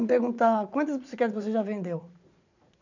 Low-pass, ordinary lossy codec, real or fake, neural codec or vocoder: 7.2 kHz; none; fake; vocoder, 44.1 kHz, 80 mel bands, Vocos